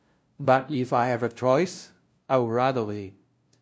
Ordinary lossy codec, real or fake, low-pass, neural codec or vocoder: none; fake; none; codec, 16 kHz, 0.5 kbps, FunCodec, trained on LibriTTS, 25 frames a second